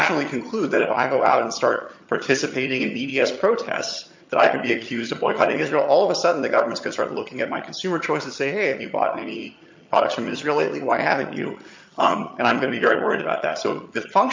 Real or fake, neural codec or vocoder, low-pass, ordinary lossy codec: fake; vocoder, 22.05 kHz, 80 mel bands, HiFi-GAN; 7.2 kHz; MP3, 48 kbps